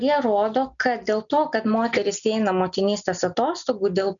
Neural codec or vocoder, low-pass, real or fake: none; 7.2 kHz; real